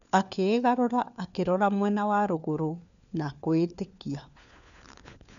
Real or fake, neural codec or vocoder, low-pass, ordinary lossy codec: fake; codec, 16 kHz, 4 kbps, FunCodec, trained on LibriTTS, 50 frames a second; 7.2 kHz; none